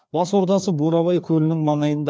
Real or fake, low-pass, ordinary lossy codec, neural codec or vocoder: fake; none; none; codec, 16 kHz, 2 kbps, FreqCodec, larger model